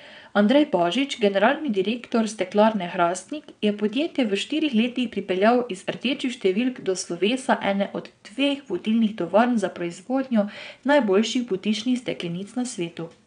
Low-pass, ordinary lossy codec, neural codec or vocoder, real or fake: 9.9 kHz; none; vocoder, 22.05 kHz, 80 mel bands, WaveNeXt; fake